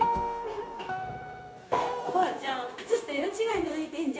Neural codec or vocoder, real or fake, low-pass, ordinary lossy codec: codec, 16 kHz, 0.9 kbps, LongCat-Audio-Codec; fake; none; none